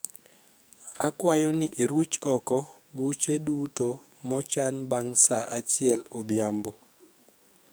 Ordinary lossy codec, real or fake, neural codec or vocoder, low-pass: none; fake; codec, 44.1 kHz, 2.6 kbps, SNAC; none